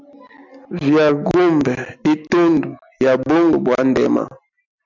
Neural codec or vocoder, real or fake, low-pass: none; real; 7.2 kHz